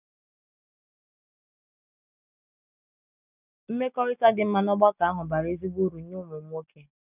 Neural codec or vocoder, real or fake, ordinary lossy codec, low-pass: none; real; none; 3.6 kHz